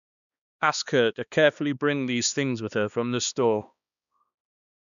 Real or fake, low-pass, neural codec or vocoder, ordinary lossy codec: fake; 7.2 kHz; codec, 16 kHz, 2 kbps, X-Codec, HuBERT features, trained on balanced general audio; none